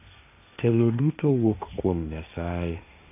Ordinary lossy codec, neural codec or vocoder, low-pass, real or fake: none; codec, 16 kHz, 1.1 kbps, Voila-Tokenizer; 3.6 kHz; fake